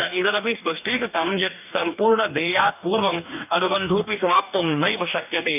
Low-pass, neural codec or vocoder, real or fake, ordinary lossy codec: 3.6 kHz; codec, 44.1 kHz, 2.6 kbps, DAC; fake; none